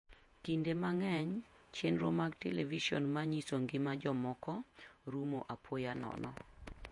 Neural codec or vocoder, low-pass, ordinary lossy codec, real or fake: vocoder, 48 kHz, 128 mel bands, Vocos; 10.8 kHz; MP3, 48 kbps; fake